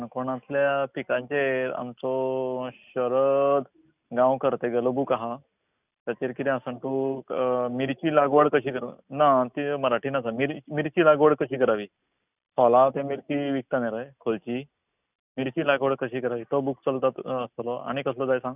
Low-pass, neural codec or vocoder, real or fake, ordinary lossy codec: 3.6 kHz; none; real; none